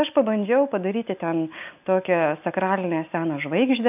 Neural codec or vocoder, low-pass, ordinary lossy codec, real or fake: none; 3.6 kHz; AAC, 32 kbps; real